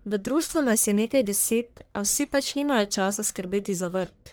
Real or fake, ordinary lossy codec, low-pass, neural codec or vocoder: fake; none; none; codec, 44.1 kHz, 1.7 kbps, Pupu-Codec